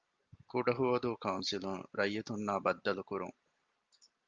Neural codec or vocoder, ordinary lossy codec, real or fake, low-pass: none; Opus, 24 kbps; real; 7.2 kHz